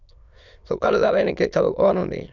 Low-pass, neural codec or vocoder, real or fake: 7.2 kHz; autoencoder, 22.05 kHz, a latent of 192 numbers a frame, VITS, trained on many speakers; fake